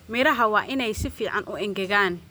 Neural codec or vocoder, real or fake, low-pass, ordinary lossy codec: none; real; none; none